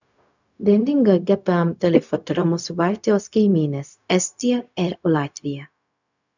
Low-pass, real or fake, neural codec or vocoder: 7.2 kHz; fake; codec, 16 kHz, 0.4 kbps, LongCat-Audio-Codec